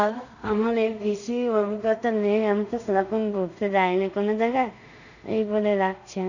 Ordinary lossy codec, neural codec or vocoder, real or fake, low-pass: none; codec, 16 kHz in and 24 kHz out, 0.4 kbps, LongCat-Audio-Codec, two codebook decoder; fake; 7.2 kHz